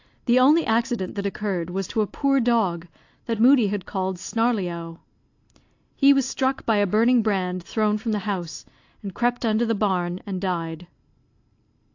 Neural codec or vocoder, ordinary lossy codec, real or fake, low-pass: none; AAC, 48 kbps; real; 7.2 kHz